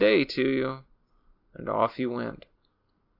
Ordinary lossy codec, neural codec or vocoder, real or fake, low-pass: AAC, 48 kbps; none; real; 5.4 kHz